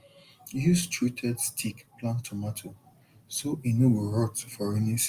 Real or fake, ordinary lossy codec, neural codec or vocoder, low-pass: real; Opus, 32 kbps; none; 14.4 kHz